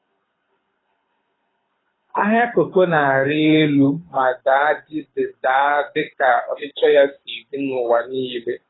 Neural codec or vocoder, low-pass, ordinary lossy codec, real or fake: codec, 24 kHz, 6 kbps, HILCodec; 7.2 kHz; AAC, 16 kbps; fake